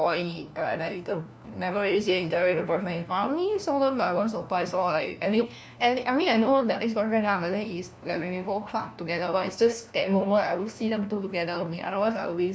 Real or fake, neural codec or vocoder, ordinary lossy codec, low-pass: fake; codec, 16 kHz, 1 kbps, FunCodec, trained on LibriTTS, 50 frames a second; none; none